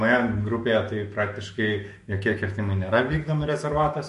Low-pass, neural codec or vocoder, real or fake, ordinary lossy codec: 14.4 kHz; autoencoder, 48 kHz, 128 numbers a frame, DAC-VAE, trained on Japanese speech; fake; MP3, 48 kbps